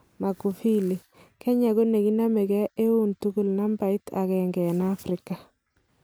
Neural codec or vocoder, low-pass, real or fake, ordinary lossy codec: none; none; real; none